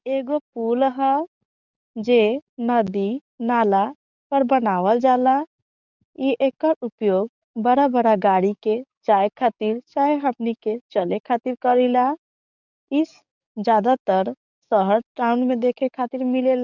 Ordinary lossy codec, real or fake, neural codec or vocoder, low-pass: none; fake; codec, 44.1 kHz, 7.8 kbps, DAC; 7.2 kHz